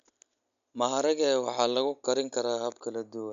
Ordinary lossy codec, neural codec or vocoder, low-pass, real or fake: none; none; 7.2 kHz; real